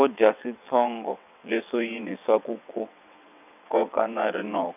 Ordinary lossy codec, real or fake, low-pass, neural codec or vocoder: none; fake; 3.6 kHz; vocoder, 22.05 kHz, 80 mel bands, WaveNeXt